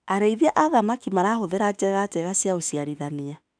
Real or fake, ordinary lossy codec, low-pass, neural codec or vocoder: fake; none; 9.9 kHz; autoencoder, 48 kHz, 32 numbers a frame, DAC-VAE, trained on Japanese speech